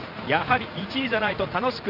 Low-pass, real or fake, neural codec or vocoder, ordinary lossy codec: 5.4 kHz; fake; vocoder, 44.1 kHz, 80 mel bands, Vocos; Opus, 32 kbps